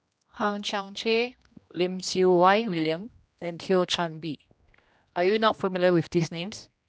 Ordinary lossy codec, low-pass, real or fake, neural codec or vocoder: none; none; fake; codec, 16 kHz, 1 kbps, X-Codec, HuBERT features, trained on general audio